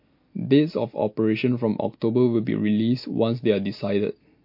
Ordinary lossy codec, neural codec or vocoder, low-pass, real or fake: MP3, 32 kbps; none; 5.4 kHz; real